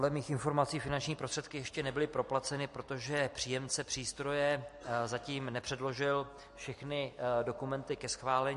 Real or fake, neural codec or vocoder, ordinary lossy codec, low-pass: real; none; MP3, 48 kbps; 14.4 kHz